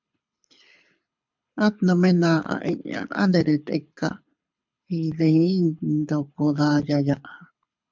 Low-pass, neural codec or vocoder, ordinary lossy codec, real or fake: 7.2 kHz; codec, 24 kHz, 6 kbps, HILCodec; MP3, 64 kbps; fake